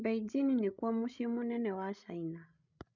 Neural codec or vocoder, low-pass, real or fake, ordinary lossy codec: codec, 16 kHz, 16 kbps, FreqCodec, larger model; 7.2 kHz; fake; none